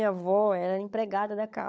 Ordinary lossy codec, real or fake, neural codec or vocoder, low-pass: none; fake; codec, 16 kHz, 16 kbps, FunCodec, trained on LibriTTS, 50 frames a second; none